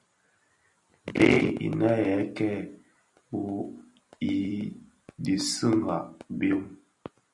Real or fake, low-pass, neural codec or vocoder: fake; 10.8 kHz; vocoder, 44.1 kHz, 128 mel bands every 256 samples, BigVGAN v2